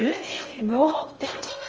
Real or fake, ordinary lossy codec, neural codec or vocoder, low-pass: fake; Opus, 24 kbps; codec, 16 kHz in and 24 kHz out, 0.6 kbps, FocalCodec, streaming, 4096 codes; 7.2 kHz